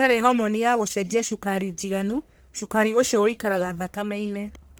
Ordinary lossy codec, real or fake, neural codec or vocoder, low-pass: none; fake; codec, 44.1 kHz, 1.7 kbps, Pupu-Codec; none